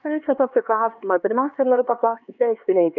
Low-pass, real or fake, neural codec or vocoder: 7.2 kHz; fake; codec, 16 kHz, 2 kbps, X-Codec, HuBERT features, trained on LibriSpeech